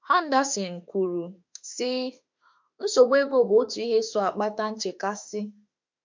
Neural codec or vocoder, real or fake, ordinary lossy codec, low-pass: autoencoder, 48 kHz, 32 numbers a frame, DAC-VAE, trained on Japanese speech; fake; MP3, 64 kbps; 7.2 kHz